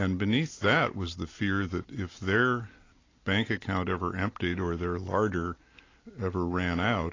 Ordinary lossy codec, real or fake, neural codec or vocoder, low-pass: AAC, 32 kbps; real; none; 7.2 kHz